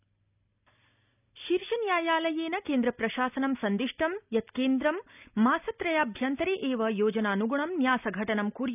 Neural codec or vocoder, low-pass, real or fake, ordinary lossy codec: none; 3.6 kHz; real; none